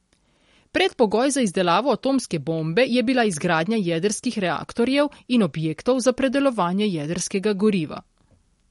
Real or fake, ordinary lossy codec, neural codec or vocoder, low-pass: real; MP3, 48 kbps; none; 19.8 kHz